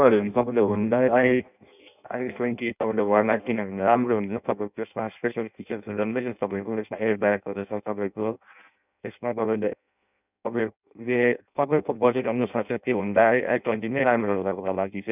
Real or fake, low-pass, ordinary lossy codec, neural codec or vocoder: fake; 3.6 kHz; none; codec, 16 kHz in and 24 kHz out, 0.6 kbps, FireRedTTS-2 codec